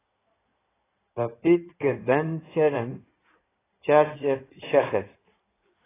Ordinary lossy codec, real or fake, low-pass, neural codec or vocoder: AAC, 16 kbps; fake; 3.6 kHz; codec, 16 kHz in and 24 kHz out, 2.2 kbps, FireRedTTS-2 codec